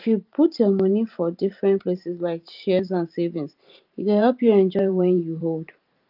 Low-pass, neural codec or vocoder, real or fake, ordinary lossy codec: 5.4 kHz; vocoder, 44.1 kHz, 128 mel bands every 512 samples, BigVGAN v2; fake; Opus, 24 kbps